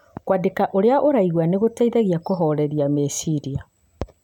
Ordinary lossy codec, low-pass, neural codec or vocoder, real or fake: none; 19.8 kHz; none; real